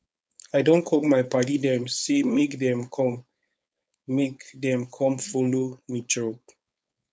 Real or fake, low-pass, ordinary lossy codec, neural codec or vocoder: fake; none; none; codec, 16 kHz, 4.8 kbps, FACodec